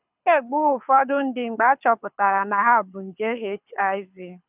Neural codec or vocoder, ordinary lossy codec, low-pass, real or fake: codec, 24 kHz, 6 kbps, HILCodec; none; 3.6 kHz; fake